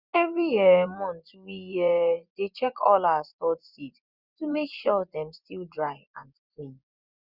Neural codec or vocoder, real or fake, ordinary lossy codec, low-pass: vocoder, 24 kHz, 100 mel bands, Vocos; fake; Opus, 64 kbps; 5.4 kHz